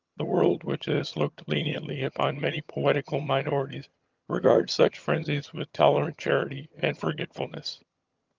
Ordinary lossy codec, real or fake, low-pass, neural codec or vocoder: Opus, 24 kbps; fake; 7.2 kHz; vocoder, 22.05 kHz, 80 mel bands, HiFi-GAN